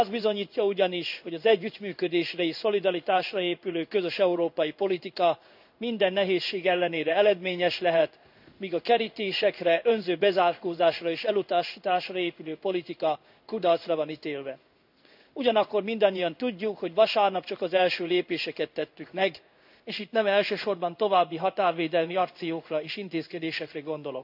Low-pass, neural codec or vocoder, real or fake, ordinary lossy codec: 5.4 kHz; codec, 16 kHz in and 24 kHz out, 1 kbps, XY-Tokenizer; fake; none